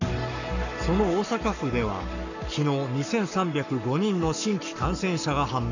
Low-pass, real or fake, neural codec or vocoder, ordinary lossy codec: 7.2 kHz; fake; codec, 44.1 kHz, 7.8 kbps, Pupu-Codec; none